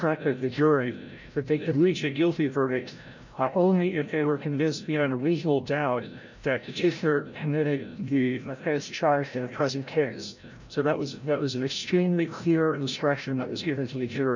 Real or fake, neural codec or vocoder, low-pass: fake; codec, 16 kHz, 0.5 kbps, FreqCodec, larger model; 7.2 kHz